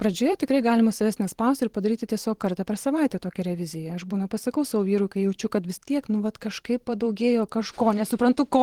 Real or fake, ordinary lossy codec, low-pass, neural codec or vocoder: fake; Opus, 16 kbps; 19.8 kHz; vocoder, 44.1 kHz, 128 mel bands every 512 samples, BigVGAN v2